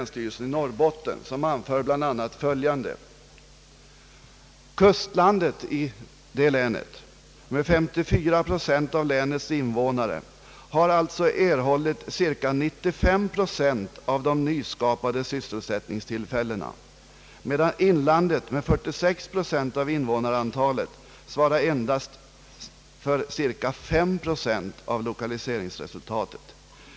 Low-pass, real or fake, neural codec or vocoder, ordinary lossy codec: none; real; none; none